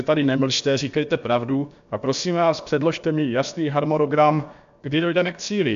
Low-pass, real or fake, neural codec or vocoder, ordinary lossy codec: 7.2 kHz; fake; codec, 16 kHz, about 1 kbps, DyCAST, with the encoder's durations; MP3, 64 kbps